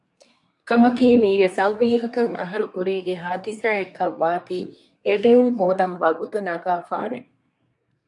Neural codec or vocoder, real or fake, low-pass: codec, 24 kHz, 1 kbps, SNAC; fake; 10.8 kHz